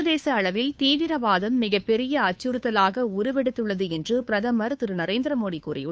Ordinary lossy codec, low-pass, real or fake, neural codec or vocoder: none; none; fake; codec, 16 kHz, 2 kbps, FunCodec, trained on Chinese and English, 25 frames a second